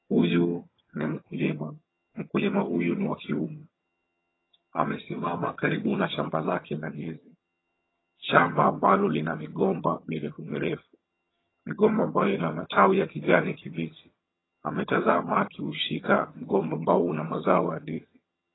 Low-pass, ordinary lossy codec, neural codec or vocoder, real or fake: 7.2 kHz; AAC, 16 kbps; vocoder, 22.05 kHz, 80 mel bands, HiFi-GAN; fake